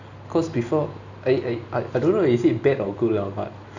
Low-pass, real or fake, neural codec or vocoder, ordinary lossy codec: 7.2 kHz; real; none; none